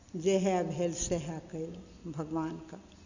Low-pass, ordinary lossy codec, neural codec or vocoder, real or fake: 7.2 kHz; Opus, 64 kbps; none; real